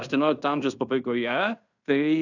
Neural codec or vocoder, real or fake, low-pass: codec, 16 kHz in and 24 kHz out, 0.9 kbps, LongCat-Audio-Codec, fine tuned four codebook decoder; fake; 7.2 kHz